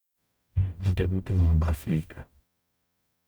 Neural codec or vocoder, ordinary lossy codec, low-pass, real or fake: codec, 44.1 kHz, 0.9 kbps, DAC; none; none; fake